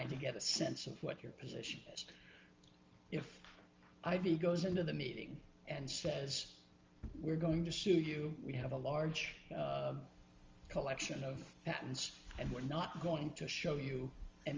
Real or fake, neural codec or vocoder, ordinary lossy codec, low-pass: real; none; Opus, 32 kbps; 7.2 kHz